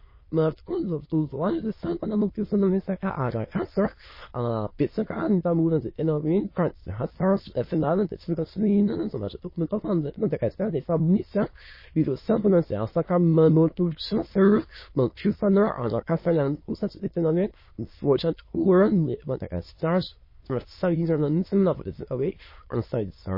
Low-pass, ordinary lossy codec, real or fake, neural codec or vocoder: 5.4 kHz; MP3, 24 kbps; fake; autoencoder, 22.05 kHz, a latent of 192 numbers a frame, VITS, trained on many speakers